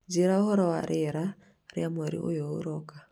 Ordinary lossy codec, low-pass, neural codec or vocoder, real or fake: none; 19.8 kHz; none; real